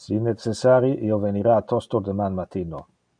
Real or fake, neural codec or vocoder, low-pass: real; none; 9.9 kHz